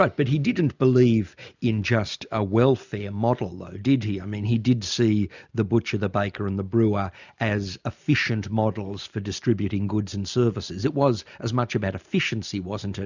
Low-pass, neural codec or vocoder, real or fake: 7.2 kHz; none; real